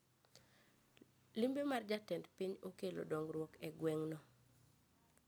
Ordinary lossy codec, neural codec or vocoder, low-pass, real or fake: none; none; none; real